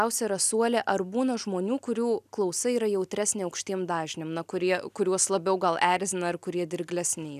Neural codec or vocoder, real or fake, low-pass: none; real; 14.4 kHz